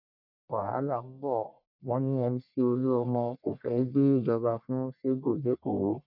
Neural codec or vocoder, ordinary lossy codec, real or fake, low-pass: codec, 44.1 kHz, 1.7 kbps, Pupu-Codec; none; fake; 5.4 kHz